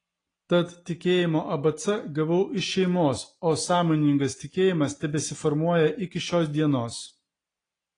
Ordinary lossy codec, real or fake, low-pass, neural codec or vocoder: AAC, 32 kbps; real; 9.9 kHz; none